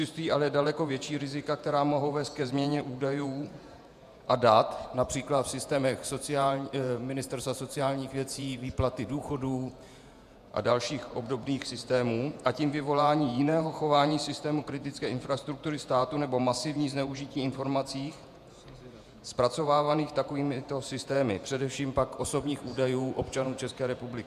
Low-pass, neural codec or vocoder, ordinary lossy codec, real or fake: 14.4 kHz; vocoder, 48 kHz, 128 mel bands, Vocos; MP3, 96 kbps; fake